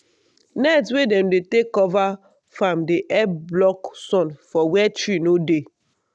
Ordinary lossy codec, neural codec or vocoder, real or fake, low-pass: none; none; real; none